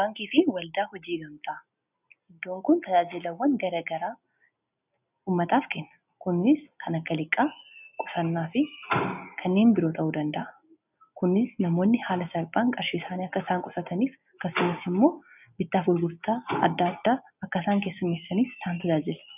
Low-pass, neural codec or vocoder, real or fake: 3.6 kHz; none; real